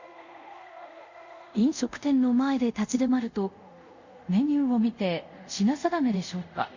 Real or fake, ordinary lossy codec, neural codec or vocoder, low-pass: fake; Opus, 64 kbps; codec, 24 kHz, 0.5 kbps, DualCodec; 7.2 kHz